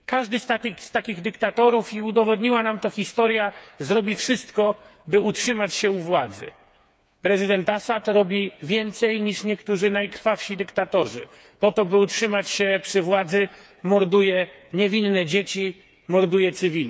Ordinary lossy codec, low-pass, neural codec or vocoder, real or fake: none; none; codec, 16 kHz, 4 kbps, FreqCodec, smaller model; fake